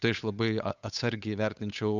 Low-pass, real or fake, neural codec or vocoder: 7.2 kHz; fake; codec, 16 kHz, 8 kbps, FunCodec, trained on Chinese and English, 25 frames a second